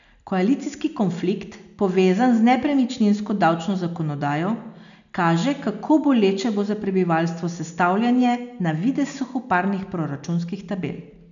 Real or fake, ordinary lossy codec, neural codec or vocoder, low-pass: real; none; none; 7.2 kHz